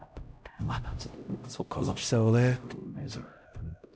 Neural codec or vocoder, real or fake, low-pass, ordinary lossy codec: codec, 16 kHz, 0.5 kbps, X-Codec, HuBERT features, trained on LibriSpeech; fake; none; none